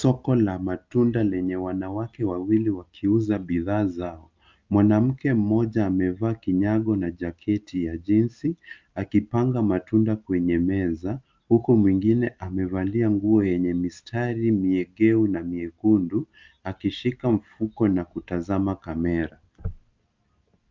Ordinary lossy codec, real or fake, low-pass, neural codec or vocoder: Opus, 24 kbps; real; 7.2 kHz; none